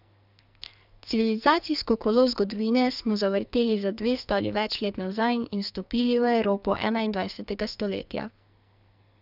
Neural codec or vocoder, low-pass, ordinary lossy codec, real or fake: codec, 44.1 kHz, 2.6 kbps, SNAC; 5.4 kHz; none; fake